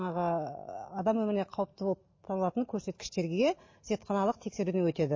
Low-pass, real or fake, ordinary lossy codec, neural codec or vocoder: 7.2 kHz; real; MP3, 32 kbps; none